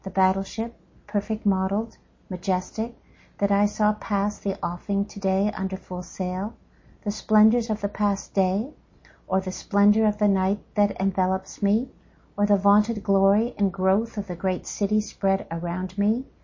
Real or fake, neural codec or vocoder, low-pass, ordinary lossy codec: real; none; 7.2 kHz; MP3, 32 kbps